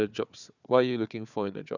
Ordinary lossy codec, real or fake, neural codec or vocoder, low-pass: none; fake; codec, 16 kHz, 4 kbps, FunCodec, trained on Chinese and English, 50 frames a second; 7.2 kHz